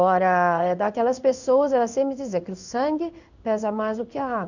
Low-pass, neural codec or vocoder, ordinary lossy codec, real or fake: 7.2 kHz; codec, 16 kHz in and 24 kHz out, 1 kbps, XY-Tokenizer; none; fake